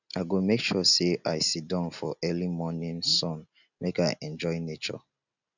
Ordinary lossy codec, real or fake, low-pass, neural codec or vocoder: none; real; 7.2 kHz; none